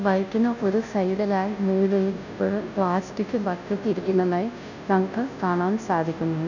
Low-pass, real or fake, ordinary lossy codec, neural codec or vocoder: 7.2 kHz; fake; none; codec, 16 kHz, 0.5 kbps, FunCodec, trained on Chinese and English, 25 frames a second